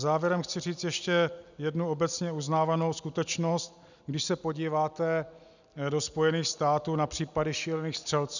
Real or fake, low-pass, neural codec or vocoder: real; 7.2 kHz; none